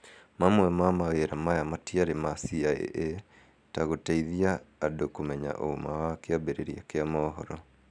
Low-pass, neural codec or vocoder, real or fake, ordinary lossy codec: 9.9 kHz; none; real; none